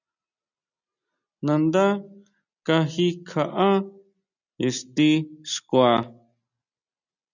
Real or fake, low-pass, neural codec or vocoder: real; 7.2 kHz; none